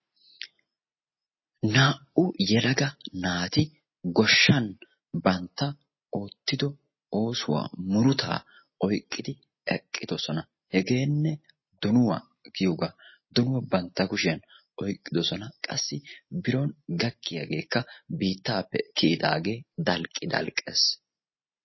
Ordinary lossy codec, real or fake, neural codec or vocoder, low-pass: MP3, 24 kbps; real; none; 7.2 kHz